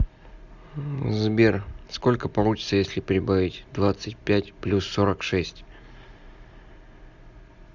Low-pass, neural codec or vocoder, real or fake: 7.2 kHz; none; real